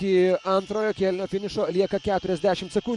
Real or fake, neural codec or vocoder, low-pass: fake; vocoder, 24 kHz, 100 mel bands, Vocos; 10.8 kHz